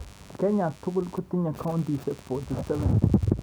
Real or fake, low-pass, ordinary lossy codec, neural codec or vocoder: real; none; none; none